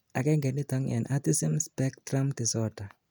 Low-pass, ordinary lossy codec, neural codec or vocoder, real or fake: none; none; none; real